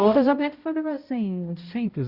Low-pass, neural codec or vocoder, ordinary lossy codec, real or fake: 5.4 kHz; codec, 16 kHz, 0.5 kbps, X-Codec, HuBERT features, trained on balanced general audio; Opus, 64 kbps; fake